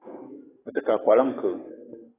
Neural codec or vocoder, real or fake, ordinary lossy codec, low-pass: codec, 44.1 kHz, 7.8 kbps, DAC; fake; AAC, 16 kbps; 3.6 kHz